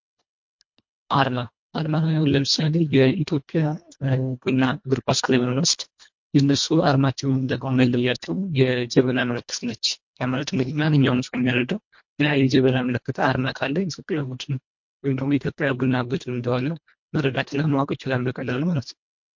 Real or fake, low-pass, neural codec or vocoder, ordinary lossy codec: fake; 7.2 kHz; codec, 24 kHz, 1.5 kbps, HILCodec; MP3, 48 kbps